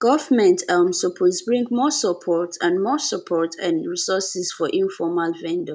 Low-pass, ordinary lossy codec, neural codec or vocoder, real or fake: none; none; none; real